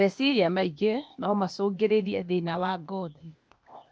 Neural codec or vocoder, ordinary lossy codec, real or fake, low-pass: codec, 16 kHz, 0.8 kbps, ZipCodec; none; fake; none